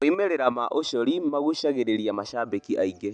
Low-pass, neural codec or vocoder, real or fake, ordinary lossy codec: 9.9 kHz; none; real; none